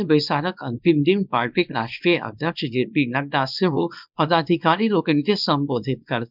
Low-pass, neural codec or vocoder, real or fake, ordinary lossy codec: 5.4 kHz; codec, 24 kHz, 0.9 kbps, WavTokenizer, small release; fake; none